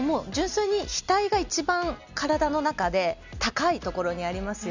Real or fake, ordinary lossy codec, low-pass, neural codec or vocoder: real; Opus, 64 kbps; 7.2 kHz; none